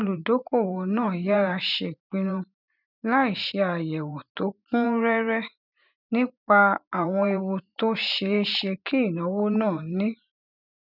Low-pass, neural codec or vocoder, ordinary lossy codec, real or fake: 5.4 kHz; vocoder, 44.1 kHz, 128 mel bands every 512 samples, BigVGAN v2; none; fake